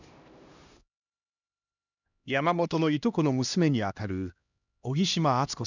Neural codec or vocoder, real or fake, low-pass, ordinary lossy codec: codec, 16 kHz, 1 kbps, X-Codec, HuBERT features, trained on LibriSpeech; fake; 7.2 kHz; none